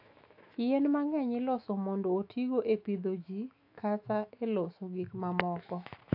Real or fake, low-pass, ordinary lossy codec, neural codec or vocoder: fake; 5.4 kHz; AAC, 48 kbps; autoencoder, 48 kHz, 128 numbers a frame, DAC-VAE, trained on Japanese speech